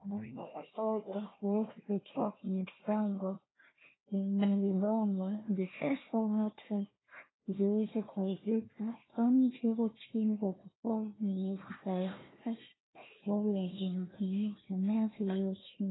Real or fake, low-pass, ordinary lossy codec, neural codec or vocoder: fake; 7.2 kHz; AAC, 16 kbps; codec, 16 kHz, 1 kbps, FunCodec, trained on Chinese and English, 50 frames a second